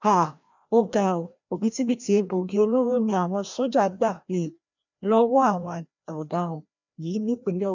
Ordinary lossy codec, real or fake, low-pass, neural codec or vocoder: none; fake; 7.2 kHz; codec, 16 kHz, 1 kbps, FreqCodec, larger model